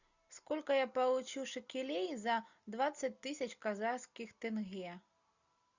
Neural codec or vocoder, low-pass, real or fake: none; 7.2 kHz; real